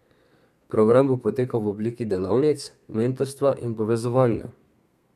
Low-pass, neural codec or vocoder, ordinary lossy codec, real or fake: 14.4 kHz; codec, 32 kHz, 1.9 kbps, SNAC; none; fake